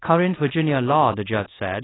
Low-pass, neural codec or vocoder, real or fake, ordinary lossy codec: 7.2 kHz; codec, 24 kHz, 0.5 kbps, DualCodec; fake; AAC, 16 kbps